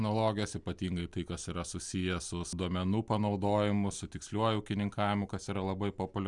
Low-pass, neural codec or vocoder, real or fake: 10.8 kHz; none; real